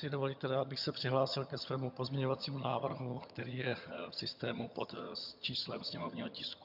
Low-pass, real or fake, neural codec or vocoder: 5.4 kHz; fake; vocoder, 22.05 kHz, 80 mel bands, HiFi-GAN